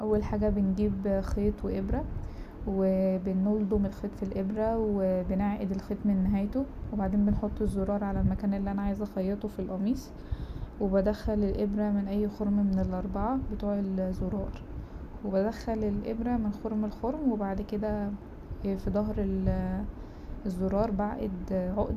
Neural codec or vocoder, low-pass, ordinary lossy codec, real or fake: none; 14.4 kHz; none; real